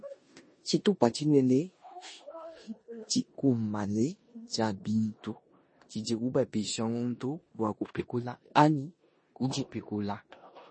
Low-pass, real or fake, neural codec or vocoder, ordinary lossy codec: 9.9 kHz; fake; codec, 16 kHz in and 24 kHz out, 0.9 kbps, LongCat-Audio-Codec, four codebook decoder; MP3, 32 kbps